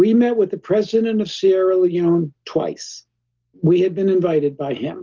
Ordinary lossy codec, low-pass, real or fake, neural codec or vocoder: Opus, 16 kbps; 7.2 kHz; real; none